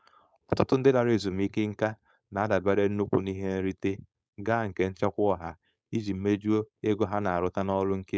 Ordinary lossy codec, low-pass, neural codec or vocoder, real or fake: none; none; codec, 16 kHz, 4.8 kbps, FACodec; fake